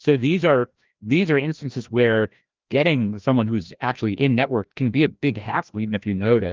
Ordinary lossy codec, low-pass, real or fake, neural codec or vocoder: Opus, 32 kbps; 7.2 kHz; fake; codec, 16 kHz, 1 kbps, FreqCodec, larger model